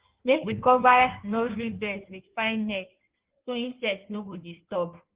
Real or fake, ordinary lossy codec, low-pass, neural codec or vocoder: fake; Opus, 16 kbps; 3.6 kHz; codec, 16 kHz in and 24 kHz out, 1.1 kbps, FireRedTTS-2 codec